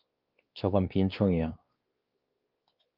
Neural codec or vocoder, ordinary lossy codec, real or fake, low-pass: codec, 16 kHz, 2 kbps, X-Codec, WavLM features, trained on Multilingual LibriSpeech; Opus, 32 kbps; fake; 5.4 kHz